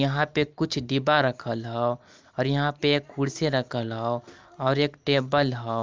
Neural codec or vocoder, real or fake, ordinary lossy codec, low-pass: none; real; Opus, 24 kbps; 7.2 kHz